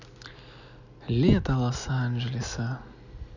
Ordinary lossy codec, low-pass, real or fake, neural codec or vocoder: none; 7.2 kHz; real; none